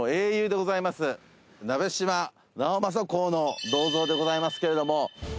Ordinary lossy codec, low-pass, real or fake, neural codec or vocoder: none; none; real; none